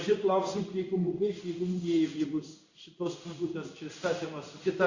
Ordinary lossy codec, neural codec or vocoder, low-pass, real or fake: Opus, 64 kbps; codec, 16 kHz in and 24 kHz out, 1 kbps, XY-Tokenizer; 7.2 kHz; fake